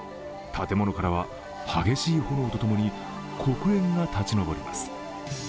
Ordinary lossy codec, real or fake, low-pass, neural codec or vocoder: none; real; none; none